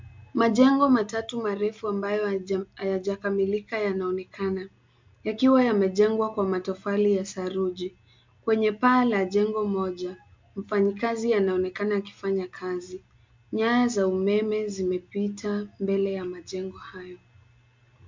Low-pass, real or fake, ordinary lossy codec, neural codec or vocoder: 7.2 kHz; real; MP3, 64 kbps; none